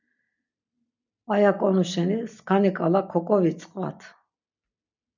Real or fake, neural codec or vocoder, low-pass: real; none; 7.2 kHz